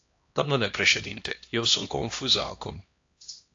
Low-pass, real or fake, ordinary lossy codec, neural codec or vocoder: 7.2 kHz; fake; AAC, 48 kbps; codec, 16 kHz, 1 kbps, X-Codec, HuBERT features, trained on LibriSpeech